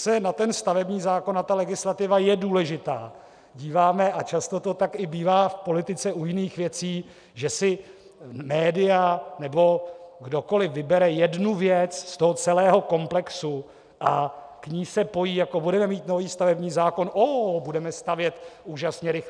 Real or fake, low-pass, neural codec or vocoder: real; 9.9 kHz; none